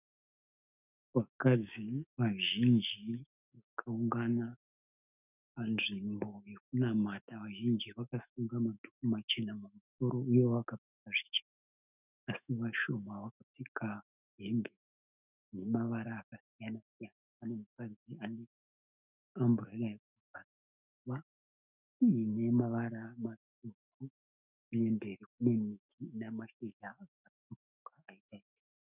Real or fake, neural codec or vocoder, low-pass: fake; autoencoder, 48 kHz, 128 numbers a frame, DAC-VAE, trained on Japanese speech; 3.6 kHz